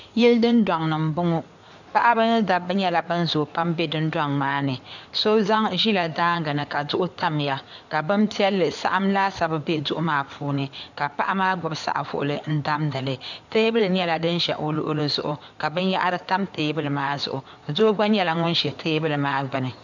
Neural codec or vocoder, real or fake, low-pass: codec, 16 kHz in and 24 kHz out, 2.2 kbps, FireRedTTS-2 codec; fake; 7.2 kHz